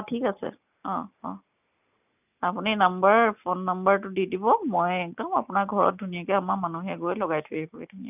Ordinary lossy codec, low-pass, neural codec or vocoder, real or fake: none; 3.6 kHz; none; real